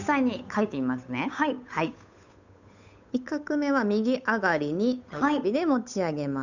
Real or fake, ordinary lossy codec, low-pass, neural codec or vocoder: fake; none; 7.2 kHz; codec, 16 kHz, 8 kbps, FunCodec, trained on Chinese and English, 25 frames a second